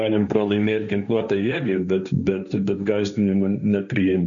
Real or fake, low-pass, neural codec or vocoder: fake; 7.2 kHz; codec, 16 kHz, 1.1 kbps, Voila-Tokenizer